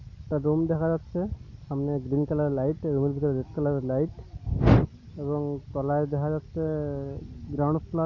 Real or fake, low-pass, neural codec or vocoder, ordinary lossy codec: real; 7.2 kHz; none; none